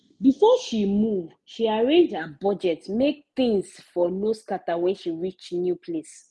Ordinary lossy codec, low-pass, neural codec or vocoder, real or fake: none; none; none; real